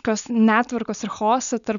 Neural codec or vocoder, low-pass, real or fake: none; 7.2 kHz; real